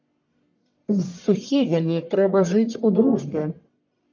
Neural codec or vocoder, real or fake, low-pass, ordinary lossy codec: codec, 44.1 kHz, 1.7 kbps, Pupu-Codec; fake; 7.2 kHz; MP3, 64 kbps